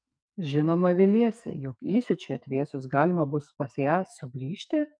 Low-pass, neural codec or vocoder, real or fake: 9.9 kHz; codec, 44.1 kHz, 2.6 kbps, SNAC; fake